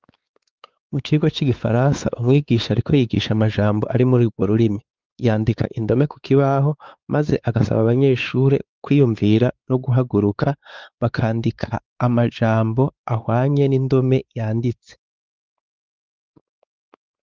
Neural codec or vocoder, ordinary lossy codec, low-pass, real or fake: codec, 16 kHz, 4 kbps, X-Codec, WavLM features, trained on Multilingual LibriSpeech; Opus, 32 kbps; 7.2 kHz; fake